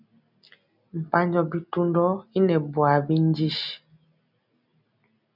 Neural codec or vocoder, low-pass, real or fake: none; 5.4 kHz; real